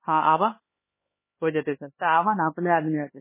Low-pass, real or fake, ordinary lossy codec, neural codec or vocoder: 3.6 kHz; fake; MP3, 16 kbps; codec, 16 kHz, 2 kbps, X-Codec, HuBERT features, trained on LibriSpeech